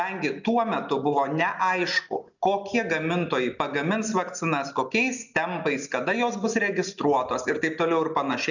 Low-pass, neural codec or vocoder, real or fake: 7.2 kHz; none; real